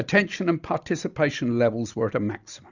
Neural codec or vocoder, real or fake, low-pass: none; real; 7.2 kHz